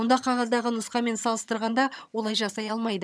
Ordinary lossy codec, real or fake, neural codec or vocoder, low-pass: none; fake; vocoder, 22.05 kHz, 80 mel bands, HiFi-GAN; none